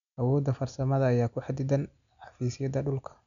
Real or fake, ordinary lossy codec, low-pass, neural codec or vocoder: real; none; 7.2 kHz; none